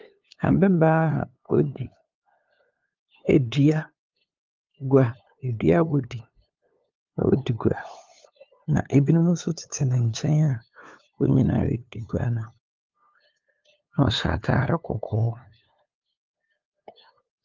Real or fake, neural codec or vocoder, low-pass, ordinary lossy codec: fake; codec, 16 kHz, 2 kbps, FunCodec, trained on LibriTTS, 25 frames a second; 7.2 kHz; Opus, 32 kbps